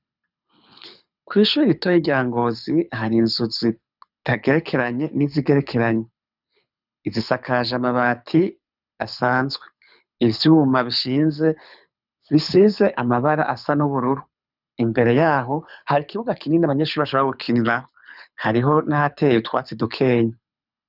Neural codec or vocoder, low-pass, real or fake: codec, 24 kHz, 6 kbps, HILCodec; 5.4 kHz; fake